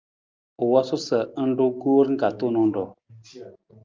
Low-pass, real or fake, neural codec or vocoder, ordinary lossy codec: 7.2 kHz; real; none; Opus, 24 kbps